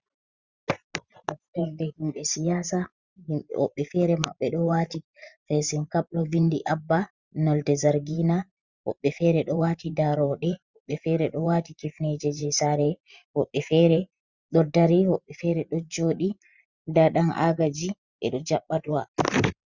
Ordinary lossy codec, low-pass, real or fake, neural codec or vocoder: Opus, 64 kbps; 7.2 kHz; fake; vocoder, 44.1 kHz, 128 mel bands every 512 samples, BigVGAN v2